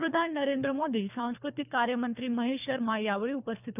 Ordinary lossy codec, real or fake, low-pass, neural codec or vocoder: none; fake; 3.6 kHz; codec, 24 kHz, 3 kbps, HILCodec